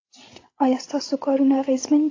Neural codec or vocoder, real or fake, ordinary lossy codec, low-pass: codec, 16 kHz, 16 kbps, FreqCodec, larger model; fake; AAC, 32 kbps; 7.2 kHz